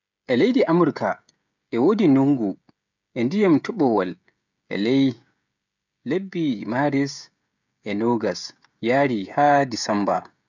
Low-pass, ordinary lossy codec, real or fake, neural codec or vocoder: 7.2 kHz; none; fake; codec, 16 kHz, 16 kbps, FreqCodec, smaller model